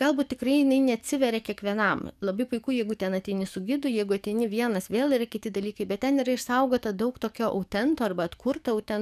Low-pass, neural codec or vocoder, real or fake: 14.4 kHz; codec, 44.1 kHz, 7.8 kbps, DAC; fake